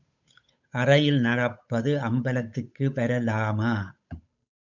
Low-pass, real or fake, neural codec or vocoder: 7.2 kHz; fake; codec, 16 kHz, 8 kbps, FunCodec, trained on Chinese and English, 25 frames a second